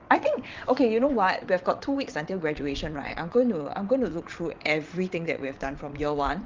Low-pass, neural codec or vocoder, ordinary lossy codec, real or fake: 7.2 kHz; vocoder, 22.05 kHz, 80 mel bands, WaveNeXt; Opus, 32 kbps; fake